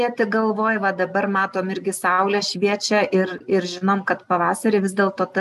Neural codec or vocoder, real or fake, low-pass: none; real; 14.4 kHz